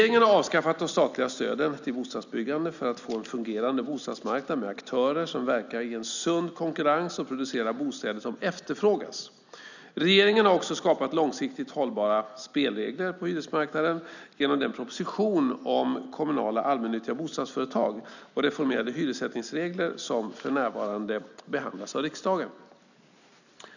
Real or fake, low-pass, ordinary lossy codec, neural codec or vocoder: real; 7.2 kHz; none; none